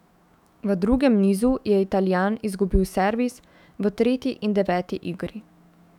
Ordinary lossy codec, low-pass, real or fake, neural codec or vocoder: none; 19.8 kHz; fake; autoencoder, 48 kHz, 128 numbers a frame, DAC-VAE, trained on Japanese speech